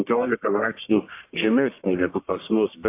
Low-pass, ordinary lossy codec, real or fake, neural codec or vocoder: 3.6 kHz; AAC, 24 kbps; fake; codec, 44.1 kHz, 1.7 kbps, Pupu-Codec